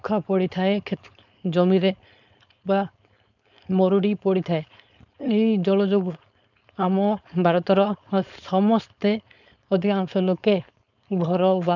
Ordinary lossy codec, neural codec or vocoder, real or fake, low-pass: none; codec, 16 kHz, 4.8 kbps, FACodec; fake; 7.2 kHz